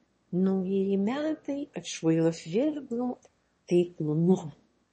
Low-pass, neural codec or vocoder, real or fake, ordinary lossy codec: 9.9 kHz; autoencoder, 22.05 kHz, a latent of 192 numbers a frame, VITS, trained on one speaker; fake; MP3, 32 kbps